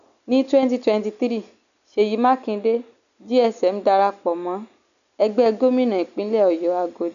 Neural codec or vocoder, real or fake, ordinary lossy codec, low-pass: none; real; none; 7.2 kHz